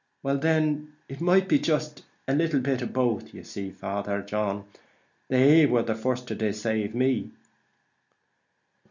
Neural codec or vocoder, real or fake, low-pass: none; real; 7.2 kHz